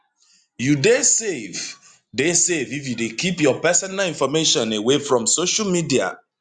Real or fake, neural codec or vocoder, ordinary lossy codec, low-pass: real; none; Opus, 64 kbps; 9.9 kHz